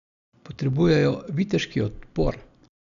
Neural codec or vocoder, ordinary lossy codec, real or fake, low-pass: none; none; real; 7.2 kHz